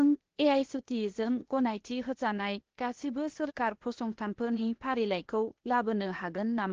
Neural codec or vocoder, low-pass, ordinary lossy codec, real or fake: codec, 16 kHz, 0.8 kbps, ZipCodec; 7.2 kHz; Opus, 16 kbps; fake